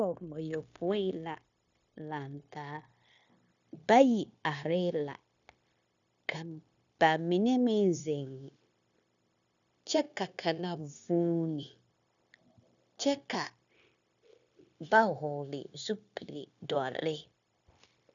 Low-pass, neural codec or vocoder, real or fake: 7.2 kHz; codec, 16 kHz, 0.9 kbps, LongCat-Audio-Codec; fake